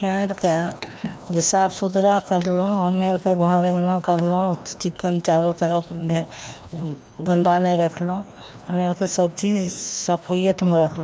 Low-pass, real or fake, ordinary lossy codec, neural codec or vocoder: none; fake; none; codec, 16 kHz, 1 kbps, FreqCodec, larger model